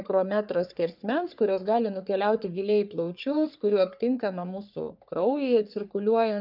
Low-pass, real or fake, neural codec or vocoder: 5.4 kHz; fake; codec, 44.1 kHz, 3.4 kbps, Pupu-Codec